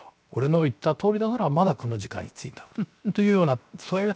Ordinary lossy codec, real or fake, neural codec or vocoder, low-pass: none; fake; codec, 16 kHz, 0.7 kbps, FocalCodec; none